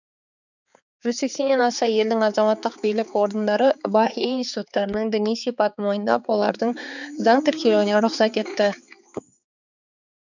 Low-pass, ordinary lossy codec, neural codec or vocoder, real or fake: 7.2 kHz; none; codec, 16 kHz, 4 kbps, X-Codec, HuBERT features, trained on balanced general audio; fake